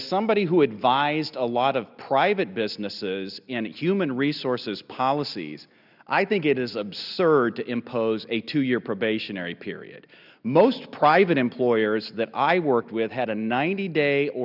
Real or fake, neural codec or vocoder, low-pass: real; none; 5.4 kHz